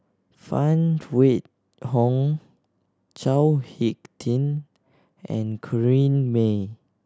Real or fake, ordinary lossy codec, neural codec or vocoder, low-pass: real; none; none; none